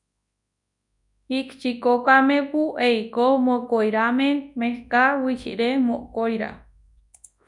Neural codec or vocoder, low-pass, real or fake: codec, 24 kHz, 0.9 kbps, WavTokenizer, large speech release; 10.8 kHz; fake